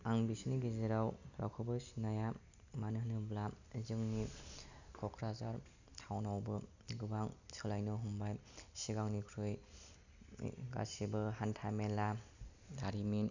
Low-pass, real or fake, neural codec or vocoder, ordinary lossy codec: 7.2 kHz; real; none; none